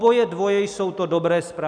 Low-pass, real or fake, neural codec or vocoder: 9.9 kHz; real; none